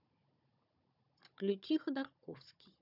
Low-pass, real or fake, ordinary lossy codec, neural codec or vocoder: 5.4 kHz; fake; none; codec, 16 kHz, 16 kbps, FunCodec, trained on Chinese and English, 50 frames a second